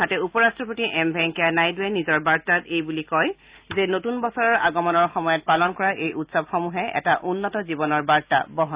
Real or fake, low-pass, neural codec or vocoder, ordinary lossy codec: real; 3.6 kHz; none; Opus, 64 kbps